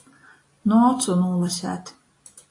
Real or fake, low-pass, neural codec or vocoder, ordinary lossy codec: real; 10.8 kHz; none; AAC, 48 kbps